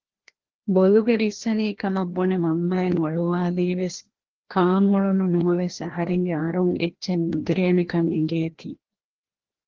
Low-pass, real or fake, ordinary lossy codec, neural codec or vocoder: 7.2 kHz; fake; Opus, 16 kbps; codec, 16 kHz, 1 kbps, FreqCodec, larger model